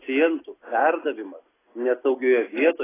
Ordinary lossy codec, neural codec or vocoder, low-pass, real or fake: AAC, 16 kbps; none; 3.6 kHz; real